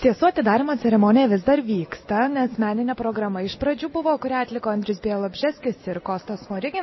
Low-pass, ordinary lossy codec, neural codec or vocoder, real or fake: 7.2 kHz; MP3, 24 kbps; none; real